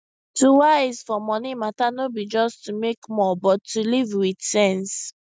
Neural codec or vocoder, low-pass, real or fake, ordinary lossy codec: none; none; real; none